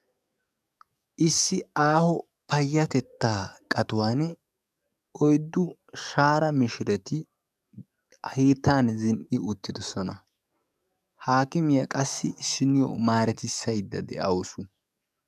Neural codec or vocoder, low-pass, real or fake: codec, 44.1 kHz, 7.8 kbps, DAC; 14.4 kHz; fake